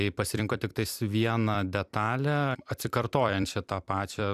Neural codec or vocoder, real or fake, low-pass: vocoder, 44.1 kHz, 128 mel bands every 256 samples, BigVGAN v2; fake; 14.4 kHz